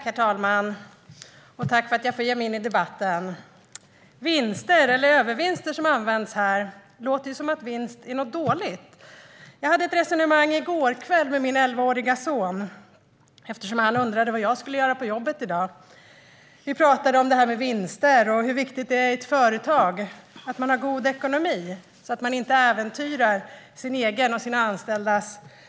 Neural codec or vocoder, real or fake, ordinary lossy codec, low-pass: none; real; none; none